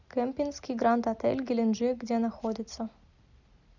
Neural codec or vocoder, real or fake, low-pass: none; real; 7.2 kHz